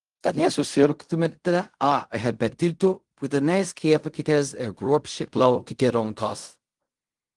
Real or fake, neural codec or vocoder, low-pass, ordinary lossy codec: fake; codec, 16 kHz in and 24 kHz out, 0.4 kbps, LongCat-Audio-Codec, fine tuned four codebook decoder; 10.8 kHz; Opus, 24 kbps